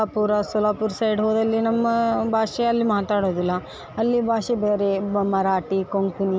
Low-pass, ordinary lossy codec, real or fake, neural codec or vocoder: none; none; real; none